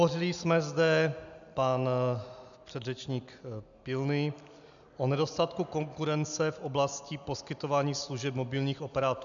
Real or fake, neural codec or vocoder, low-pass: real; none; 7.2 kHz